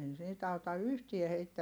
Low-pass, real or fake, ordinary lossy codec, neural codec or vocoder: none; real; none; none